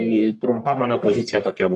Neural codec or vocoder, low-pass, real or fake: codec, 44.1 kHz, 1.7 kbps, Pupu-Codec; 10.8 kHz; fake